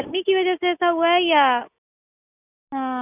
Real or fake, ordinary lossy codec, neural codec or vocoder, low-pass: real; none; none; 3.6 kHz